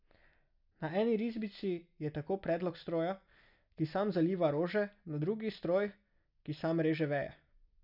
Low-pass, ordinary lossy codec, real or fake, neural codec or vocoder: 5.4 kHz; none; real; none